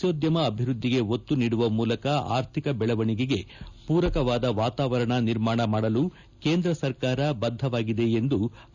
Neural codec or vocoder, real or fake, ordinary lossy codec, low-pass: none; real; none; 7.2 kHz